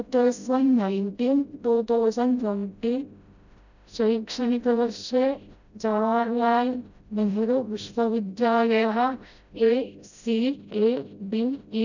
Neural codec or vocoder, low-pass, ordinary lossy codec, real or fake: codec, 16 kHz, 0.5 kbps, FreqCodec, smaller model; 7.2 kHz; none; fake